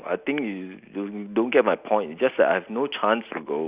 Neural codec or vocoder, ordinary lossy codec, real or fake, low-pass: none; Opus, 64 kbps; real; 3.6 kHz